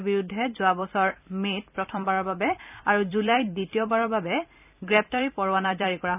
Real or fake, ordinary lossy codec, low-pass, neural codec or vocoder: fake; none; 3.6 kHz; vocoder, 44.1 kHz, 128 mel bands every 256 samples, BigVGAN v2